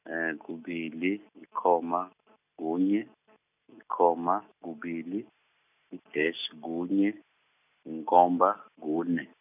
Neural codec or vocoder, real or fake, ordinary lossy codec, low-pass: none; real; none; 3.6 kHz